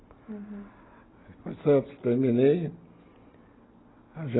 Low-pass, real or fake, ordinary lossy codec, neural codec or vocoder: 7.2 kHz; real; AAC, 16 kbps; none